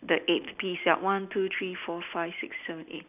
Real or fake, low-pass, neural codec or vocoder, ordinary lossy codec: real; 3.6 kHz; none; none